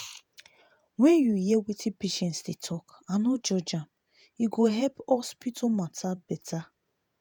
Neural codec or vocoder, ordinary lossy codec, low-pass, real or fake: vocoder, 48 kHz, 128 mel bands, Vocos; none; none; fake